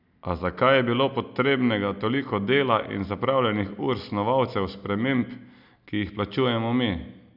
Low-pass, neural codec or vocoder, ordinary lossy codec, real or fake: 5.4 kHz; none; none; real